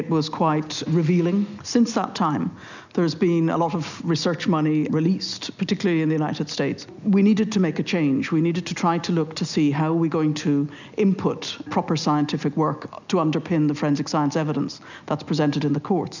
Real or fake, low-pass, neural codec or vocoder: real; 7.2 kHz; none